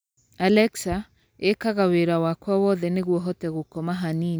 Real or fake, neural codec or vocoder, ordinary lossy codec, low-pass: real; none; none; none